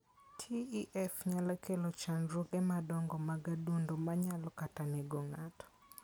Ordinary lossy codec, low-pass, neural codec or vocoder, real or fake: none; none; none; real